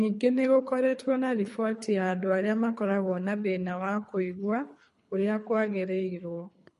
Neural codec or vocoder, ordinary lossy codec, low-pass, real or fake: codec, 24 kHz, 3 kbps, HILCodec; MP3, 48 kbps; 10.8 kHz; fake